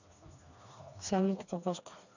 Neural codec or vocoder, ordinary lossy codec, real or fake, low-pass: codec, 16 kHz, 2 kbps, FreqCodec, smaller model; none; fake; 7.2 kHz